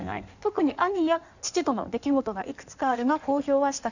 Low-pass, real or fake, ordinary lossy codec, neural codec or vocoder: 7.2 kHz; fake; none; codec, 16 kHz in and 24 kHz out, 1.1 kbps, FireRedTTS-2 codec